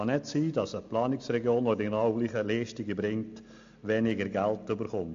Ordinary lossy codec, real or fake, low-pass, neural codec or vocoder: AAC, 64 kbps; real; 7.2 kHz; none